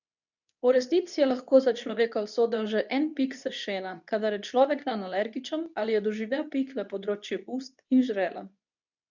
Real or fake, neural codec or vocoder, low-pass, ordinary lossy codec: fake; codec, 24 kHz, 0.9 kbps, WavTokenizer, medium speech release version 2; 7.2 kHz; none